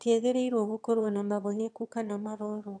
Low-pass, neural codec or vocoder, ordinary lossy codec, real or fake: 9.9 kHz; autoencoder, 22.05 kHz, a latent of 192 numbers a frame, VITS, trained on one speaker; none; fake